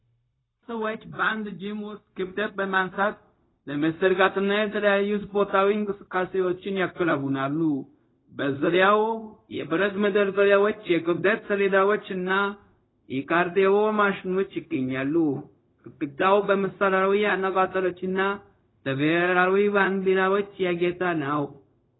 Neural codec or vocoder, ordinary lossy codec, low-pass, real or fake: codec, 16 kHz, 0.4 kbps, LongCat-Audio-Codec; AAC, 16 kbps; 7.2 kHz; fake